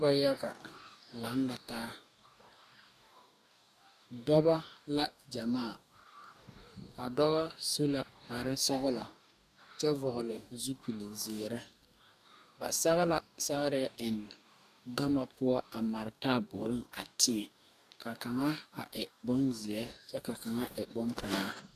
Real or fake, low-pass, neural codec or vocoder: fake; 14.4 kHz; codec, 44.1 kHz, 2.6 kbps, DAC